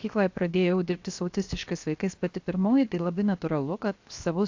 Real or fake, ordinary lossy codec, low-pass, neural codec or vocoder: fake; AAC, 48 kbps; 7.2 kHz; codec, 16 kHz, 0.7 kbps, FocalCodec